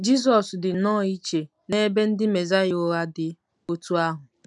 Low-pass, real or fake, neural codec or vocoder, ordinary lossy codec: 10.8 kHz; real; none; none